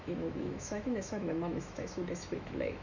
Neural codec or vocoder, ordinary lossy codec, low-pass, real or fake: autoencoder, 48 kHz, 128 numbers a frame, DAC-VAE, trained on Japanese speech; none; 7.2 kHz; fake